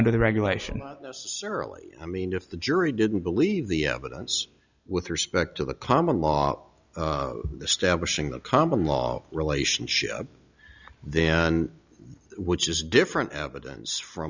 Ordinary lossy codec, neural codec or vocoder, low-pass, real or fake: Opus, 64 kbps; none; 7.2 kHz; real